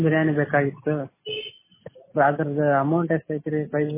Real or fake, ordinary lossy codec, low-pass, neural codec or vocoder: real; MP3, 16 kbps; 3.6 kHz; none